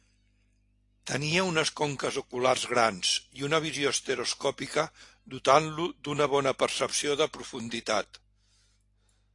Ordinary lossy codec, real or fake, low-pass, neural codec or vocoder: AAC, 48 kbps; real; 10.8 kHz; none